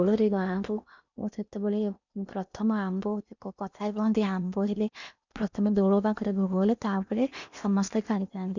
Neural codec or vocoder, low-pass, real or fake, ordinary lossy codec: codec, 16 kHz in and 24 kHz out, 0.8 kbps, FocalCodec, streaming, 65536 codes; 7.2 kHz; fake; none